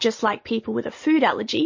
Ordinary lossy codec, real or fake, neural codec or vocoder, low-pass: MP3, 32 kbps; real; none; 7.2 kHz